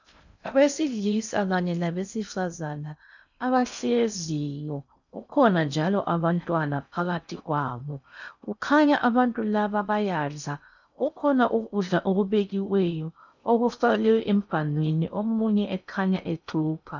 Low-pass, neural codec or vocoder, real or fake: 7.2 kHz; codec, 16 kHz in and 24 kHz out, 0.6 kbps, FocalCodec, streaming, 2048 codes; fake